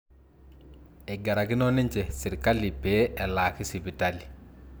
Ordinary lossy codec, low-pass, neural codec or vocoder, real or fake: none; none; none; real